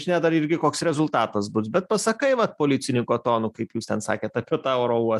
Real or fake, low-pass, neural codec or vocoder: real; 14.4 kHz; none